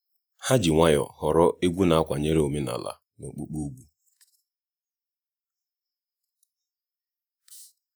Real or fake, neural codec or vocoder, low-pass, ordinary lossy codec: fake; vocoder, 48 kHz, 128 mel bands, Vocos; none; none